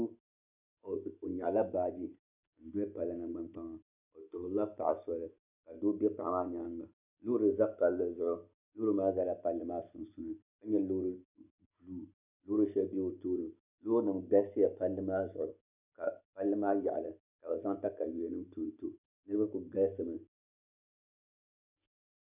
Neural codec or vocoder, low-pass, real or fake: none; 3.6 kHz; real